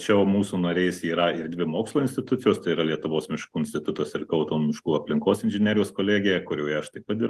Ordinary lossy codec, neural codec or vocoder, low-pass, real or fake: Opus, 24 kbps; none; 14.4 kHz; real